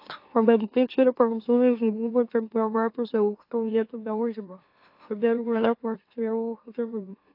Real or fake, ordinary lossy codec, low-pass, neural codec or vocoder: fake; AAC, 32 kbps; 5.4 kHz; autoencoder, 44.1 kHz, a latent of 192 numbers a frame, MeloTTS